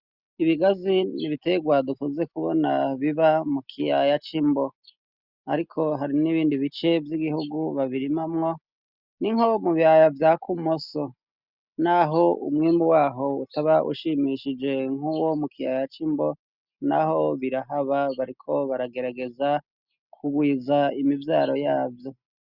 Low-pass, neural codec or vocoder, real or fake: 5.4 kHz; none; real